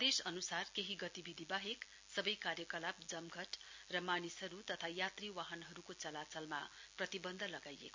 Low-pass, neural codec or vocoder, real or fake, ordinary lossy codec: 7.2 kHz; none; real; MP3, 64 kbps